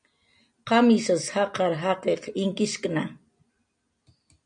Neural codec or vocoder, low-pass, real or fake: none; 9.9 kHz; real